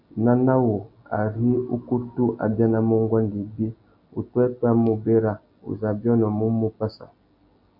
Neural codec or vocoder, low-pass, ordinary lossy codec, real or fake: none; 5.4 kHz; AAC, 48 kbps; real